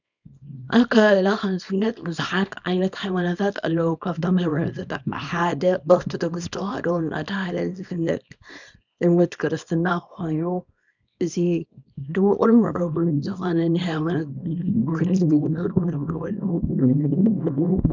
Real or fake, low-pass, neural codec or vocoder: fake; 7.2 kHz; codec, 24 kHz, 0.9 kbps, WavTokenizer, small release